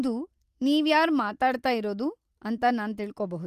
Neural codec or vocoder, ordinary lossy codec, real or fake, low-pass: none; Opus, 24 kbps; real; 14.4 kHz